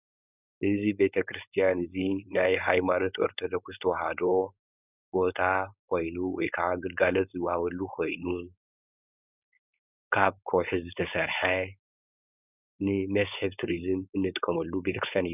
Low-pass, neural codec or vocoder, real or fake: 3.6 kHz; codec, 16 kHz, 4.8 kbps, FACodec; fake